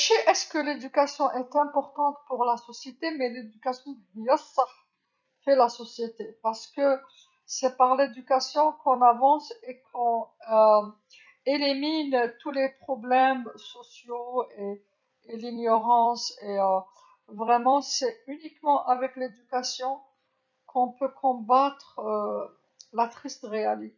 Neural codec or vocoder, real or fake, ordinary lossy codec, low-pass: vocoder, 44.1 kHz, 128 mel bands every 256 samples, BigVGAN v2; fake; none; 7.2 kHz